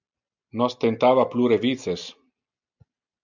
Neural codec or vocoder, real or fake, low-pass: none; real; 7.2 kHz